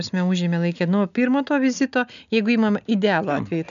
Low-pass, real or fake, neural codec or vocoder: 7.2 kHz; real; none